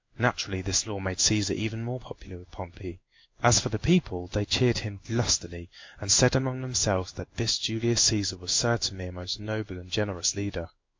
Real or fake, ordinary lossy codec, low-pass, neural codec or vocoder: fake; MP3, 48 kbps; 7.2 kHz; codec, 16 kHz in and 24 kHz out, 1 kbps, XY-Tokenizer